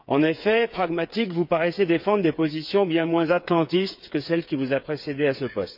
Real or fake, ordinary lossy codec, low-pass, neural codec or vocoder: fake; none; 5.4 kHz; codec, 16 kHz, 8 kbps, FreqCodec, smaller model